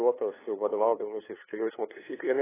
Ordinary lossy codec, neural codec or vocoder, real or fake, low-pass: AAC, 16 kbps; codec, 16 kHz, 2 kbps, FunCodec, trained on LibriTTS, 25 frames a second; fake; 3.6 kHz